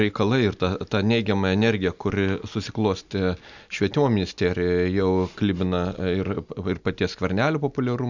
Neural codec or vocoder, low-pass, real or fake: none; 7.2 kHz; real